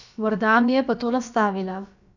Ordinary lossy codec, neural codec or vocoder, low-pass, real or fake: none; codec, 16 kHz, about 1 kbps, DyCAST, with the encoder's durations; 7.2 kHz; fake